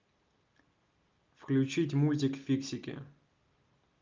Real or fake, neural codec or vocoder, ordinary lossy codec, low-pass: real; none; Opus, 32 kbps; 7.2 kHz